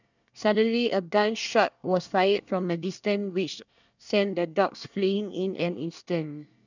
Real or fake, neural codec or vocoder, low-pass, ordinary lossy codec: fake; codec, 24 kHz, 1 kbps, SNAC; 7.2 kHz; none